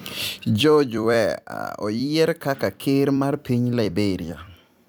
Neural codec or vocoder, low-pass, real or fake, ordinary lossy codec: vocoder, 44.1 kHz, 128 mel bands every 256 samples, BigVGAN v2; none; fake; none